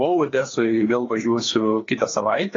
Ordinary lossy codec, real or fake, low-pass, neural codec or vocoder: AAC, 32 kbps; fake; 7.2 kHz; codec, 16 kHz, 2 kbps, FreqCodec, larger model